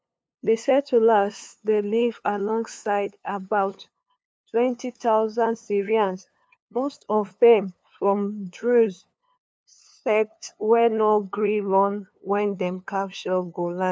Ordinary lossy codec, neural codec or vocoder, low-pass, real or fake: none; codec, 16 kHz, 2 kbps, FunCodec, trained on LibriTTS, 25 frames a second; none; fake